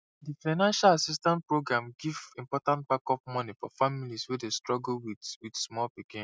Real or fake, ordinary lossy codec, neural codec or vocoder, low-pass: real; none; none; none